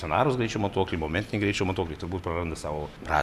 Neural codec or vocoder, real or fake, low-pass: none; real; 14.4 kHz